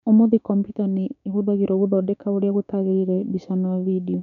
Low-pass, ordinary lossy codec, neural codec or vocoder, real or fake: 7.2 kHz; none; codec, 16 kHz, 6 kbps, DAC; fake